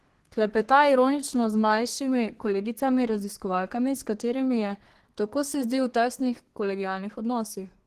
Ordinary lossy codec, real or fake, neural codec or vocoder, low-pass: Opus, 16 kbps; fake; codec, 32 kHz, 1.9 kbps, SNAC; 14.4 kHz